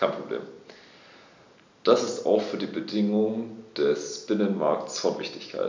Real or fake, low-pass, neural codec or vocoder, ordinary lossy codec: real; 7.2 kHz; none; MP3, 64 kbps